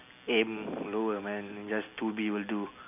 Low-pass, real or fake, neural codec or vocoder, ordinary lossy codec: 3.6 kHz; real; none; none